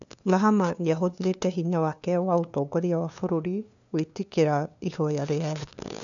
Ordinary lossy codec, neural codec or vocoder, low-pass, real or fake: none; codec, 16 kHz, 2 kbps, FunCodec, trained on LibriTTS, 25 frames a second; 7.2 kHz; fake